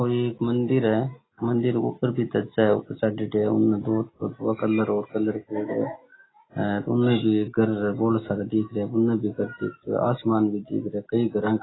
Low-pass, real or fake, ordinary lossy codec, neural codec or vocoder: 7.2 kHz; real; AAC, 16 kbps; none